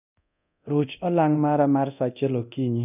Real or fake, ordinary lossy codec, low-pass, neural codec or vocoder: fake; none; 3.6 kHz; codec, 24 kHz, 0.9 kbps, DualCodec